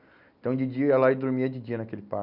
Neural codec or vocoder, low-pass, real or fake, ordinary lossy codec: none; 5.4 kHz; real; none